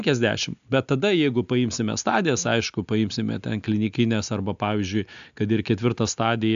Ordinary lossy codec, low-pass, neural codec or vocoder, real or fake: AAC, 96 kbps; 7.2 kHz; none; real